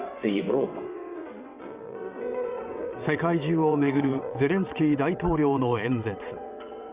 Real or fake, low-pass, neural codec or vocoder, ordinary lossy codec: fake; 3.6 kHz; vocoder, 22.05 kHz, 80 mel bands, WaveNeXt; Opus, 64 kbps